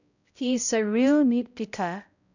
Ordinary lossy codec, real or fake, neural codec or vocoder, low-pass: none; fake; codec, 16 kHz, 0.5 kbps, X-Codec, HuBERT features, trained on balanced general audio; 7.2 kHz